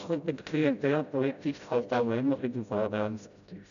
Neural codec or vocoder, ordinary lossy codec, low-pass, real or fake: codec, 16 kHz, 0.5 kbps, FreqCodec, smaller model; none; 7.2 kHz; fake